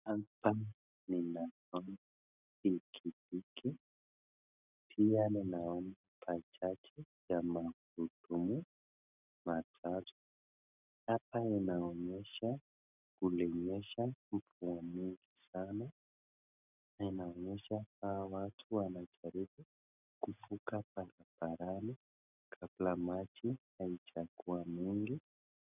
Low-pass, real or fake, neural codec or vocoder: 3.6 kHz; real; none